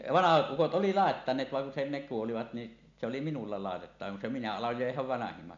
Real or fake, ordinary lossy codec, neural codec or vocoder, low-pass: real; none; none; 7.2 kHz